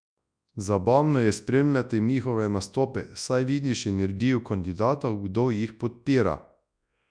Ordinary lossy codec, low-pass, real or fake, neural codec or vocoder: none; 9.9 kHz; fake; codec, 24 kHz, 0.9 kbps, WavTokenizer, large speech release